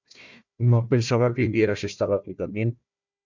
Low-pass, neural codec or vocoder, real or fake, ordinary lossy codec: 7.2 kHz; codec, 16 kHz, 1 kbps, FunCodec, trained on Chinese and English, 50 frames a second; fake; MP3, 64 kbps